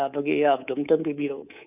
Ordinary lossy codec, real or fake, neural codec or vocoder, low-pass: none; fake; codec, 24 kHz, 3.1 kbps, DualCodec; 3.6 kHz